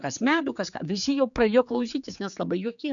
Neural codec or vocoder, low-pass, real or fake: codec, 16 kHz, 4 kbps, X-Codec, HuBERT features, trained on general audio; 7.2 kHz; fake